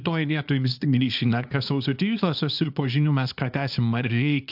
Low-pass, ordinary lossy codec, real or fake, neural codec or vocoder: 5.4 kHz; AAC, 48 kbps; fake; codec, 24 kHz, 0.9 kbps, WavTokenizer, small release